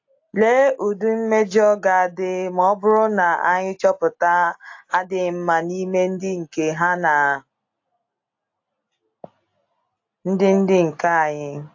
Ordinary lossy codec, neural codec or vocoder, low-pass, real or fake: AAC, 48 kbps; none; 7.2 kHz; real